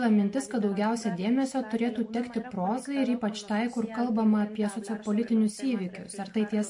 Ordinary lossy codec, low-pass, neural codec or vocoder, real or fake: MP3, 48 kbps; 10.8 kHz; none; real